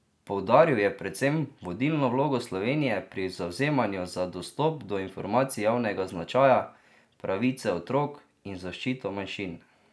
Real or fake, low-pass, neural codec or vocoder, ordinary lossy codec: real; none; none; none